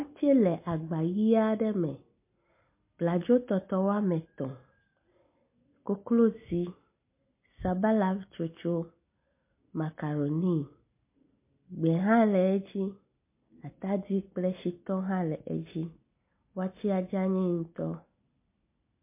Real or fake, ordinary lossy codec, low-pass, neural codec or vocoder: real; MP3, 24 kbps; 3.6 kHz; none